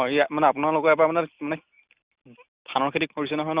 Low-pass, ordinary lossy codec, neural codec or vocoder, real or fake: 3.6 kHz; Opus, 64 kbps; none; real